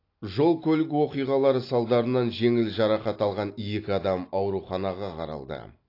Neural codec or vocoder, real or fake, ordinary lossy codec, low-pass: none; real; AAC, 32 kbps; 5.4 kHz